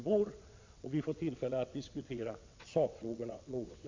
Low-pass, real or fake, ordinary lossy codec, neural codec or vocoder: 7.2 kHz; fake; MP3, 48 kbps; vocoder, 22.05 kHz, 80 mel bands, WaveNeXt